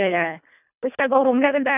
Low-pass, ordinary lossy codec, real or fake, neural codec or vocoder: 3.6 kHz; none; fake; codec, 24 kHz, 1.5 kbps, HILCodec